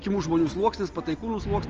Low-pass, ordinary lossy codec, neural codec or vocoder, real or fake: 7.2 kHz; Opus, 24 kbps; none; real